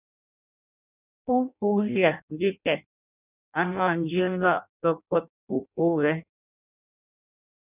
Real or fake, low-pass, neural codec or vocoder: fake; 3.6 kHz; codec, 16 kHz in and 24 kHz out, 0.6 kbps, FireRedTTS-2 codec